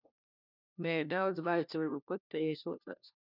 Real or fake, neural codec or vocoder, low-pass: fake; codec, 16 kHz, 1 kbps, FunCodec, trained on LibriTTS, 50 frames a second; 5.4 kHz